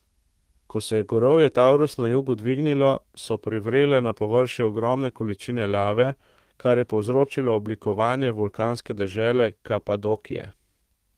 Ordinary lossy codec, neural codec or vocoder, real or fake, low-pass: Opus, 24 kbps; codec, 32 kHz, 1.9 kbps, SNAC; fake; 14.4 kHz